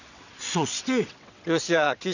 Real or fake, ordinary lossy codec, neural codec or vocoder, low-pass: fake; none; vocoder, 22.05 kHz, 80 mel bands, WaveNeXt; 7.2 kHz